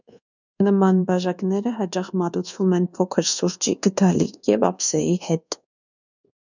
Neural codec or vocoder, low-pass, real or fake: codec, 24 kHz, 1.2 kbps, DualCodec; 7.2 kHz; fake